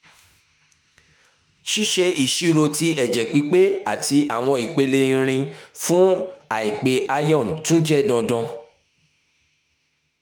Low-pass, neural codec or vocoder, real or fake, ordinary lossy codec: none; autoencoder, 48 kHz, 32 numbers a frame, DAC-VAE, trained on Japanese speech; fake; none